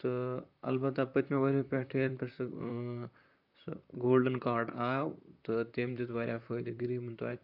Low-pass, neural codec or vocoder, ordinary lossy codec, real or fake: 5.4 kHz; vocoder, 44.1 kHz, 128 mel bands, Pupu-Vocoder; AAC, 48 kbps; fake